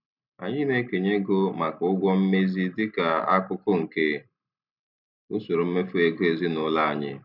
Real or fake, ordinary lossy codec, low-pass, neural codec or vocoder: real; none; 5.4 kHz; none